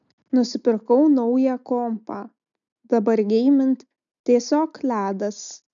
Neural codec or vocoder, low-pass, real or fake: none; 7.2 kHz; real